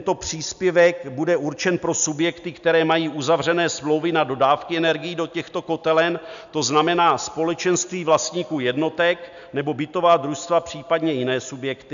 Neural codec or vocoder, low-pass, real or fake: none; 7.2 kHz; real